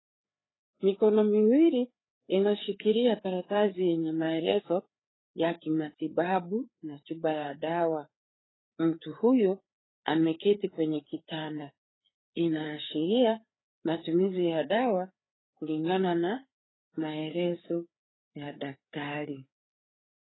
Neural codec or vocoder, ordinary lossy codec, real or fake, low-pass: codec, 16 kHz, 4 kbps, FreqCodec, larger model; AAC, 16 kbps; fake; 7.2 kHz